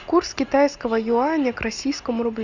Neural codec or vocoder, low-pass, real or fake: none; 7.2 kHz; real